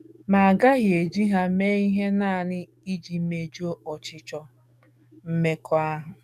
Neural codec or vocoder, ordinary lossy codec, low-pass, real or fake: codec, 44.1 kHz, 7.8 kbps, DAC; none; 14.4 kHz; fake